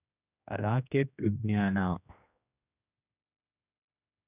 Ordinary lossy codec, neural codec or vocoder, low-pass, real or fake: none; codec, 16 kHz, 1 kbps, X-Codec, HuBERT features, trained on general audio; 3.6 kHz; fake